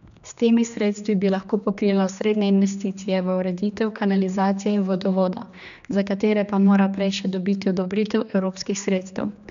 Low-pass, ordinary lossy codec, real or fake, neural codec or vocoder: 7.2 kHz; none; fake; codec, 16 kHz, 2 kbps, X-Codec, HuBERT features, trained on general audio